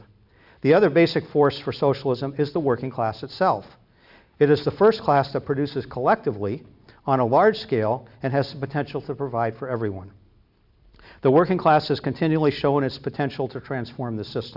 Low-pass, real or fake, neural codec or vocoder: 5.4 kHz; real; none